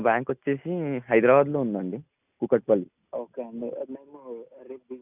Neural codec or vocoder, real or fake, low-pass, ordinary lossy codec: none; real; 3.6 kHz; none